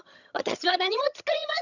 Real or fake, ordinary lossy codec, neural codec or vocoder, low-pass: fake; none; vocoder, 22.05 kHz, 80 mel bands, HiFi-GAN; 7.2 kHz